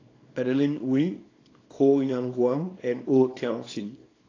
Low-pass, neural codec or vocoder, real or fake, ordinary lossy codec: 7.2 kHz; codec, 24 kHz, 0.9 kbps, WavTokenizer, small release; fake; AAC, 32 kbps